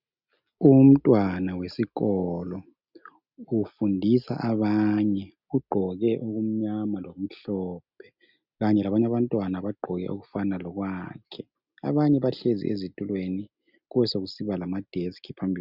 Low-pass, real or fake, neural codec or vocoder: 5.4 kHz; real; none